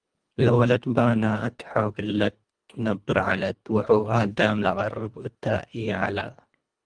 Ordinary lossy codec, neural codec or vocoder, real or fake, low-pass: Opus, 32 kbps; codec, 24 kHz, 1.5 kbps, HILCodec; fake; 9.9 kHz